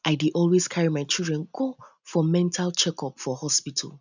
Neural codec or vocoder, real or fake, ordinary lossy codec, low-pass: none; real; none; 7.2 kHz